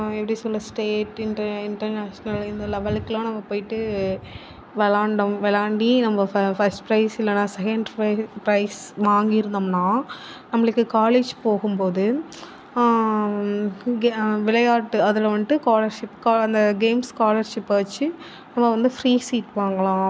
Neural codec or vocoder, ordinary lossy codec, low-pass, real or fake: none; none; none; real